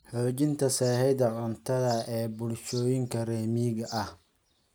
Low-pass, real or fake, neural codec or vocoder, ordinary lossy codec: none; real; none; none